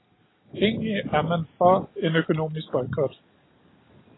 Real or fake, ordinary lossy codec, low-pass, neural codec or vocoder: real; AAC, 16 kbps; 7.2 kHz; none